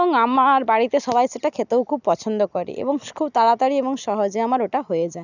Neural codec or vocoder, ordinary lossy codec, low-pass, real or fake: none; none; 7.2 kHz; real